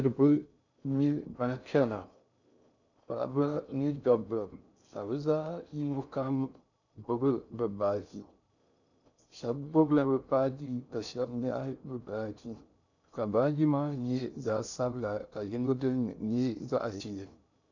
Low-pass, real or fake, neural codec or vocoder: 7.2 kHz; fake; codec, 16 kHz in and 24 kHz out, 0.6 kbps, FocalCodec, streaming, 2048 codes